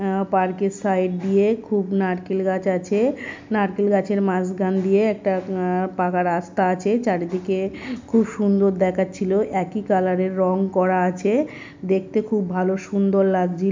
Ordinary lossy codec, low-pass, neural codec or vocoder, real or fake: MP3, 64 kbps; 7.2 kHz; none; real